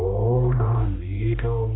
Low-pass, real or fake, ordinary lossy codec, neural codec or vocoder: 7.2 kHz; fake; AAC, 16 kbps; codec, 16 kHz, 1 kbps, X-Codec, HuBERT features, trained on balanced general audio